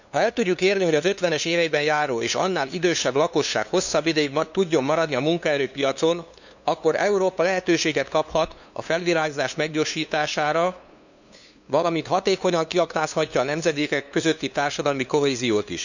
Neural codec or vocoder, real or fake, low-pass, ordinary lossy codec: codec, 16 kHz, 2 kbps, FunCodec, trained on LibriTTS, 25 frames a second; fake; 7.2 kHz; none